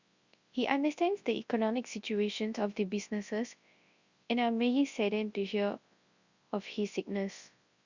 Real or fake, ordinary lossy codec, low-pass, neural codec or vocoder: fake; Opus, 64 kbps; 7.2 kHz; codec, 24 kHz, 0.9 kbps, WavTokenizer, large speech release